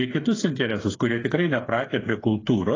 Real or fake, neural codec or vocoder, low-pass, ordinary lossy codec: fake; codec, 16 kHz, 8 kbps, FreqCodec, smaller model; 7.2 kHz; AAC, 32 kbps